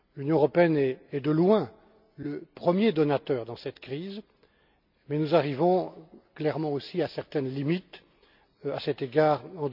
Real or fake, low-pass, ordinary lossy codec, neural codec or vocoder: real; 5.4 kHz; none; none